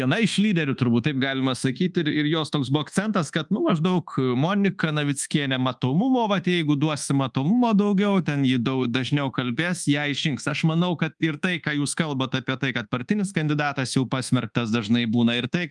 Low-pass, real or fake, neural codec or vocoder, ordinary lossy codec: 10.8 kHz; fake; codec, 24 kHz, 1.2 kbps, DualCodec; Opus, 32 kbps